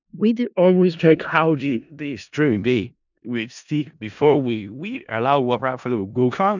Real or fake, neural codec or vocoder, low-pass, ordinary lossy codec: fake; codec, 16 kHz in and 24 kHz out, 0.4 kbps, LongCat-Audio-Codec, four codebook decoder; 7.2 kHz; none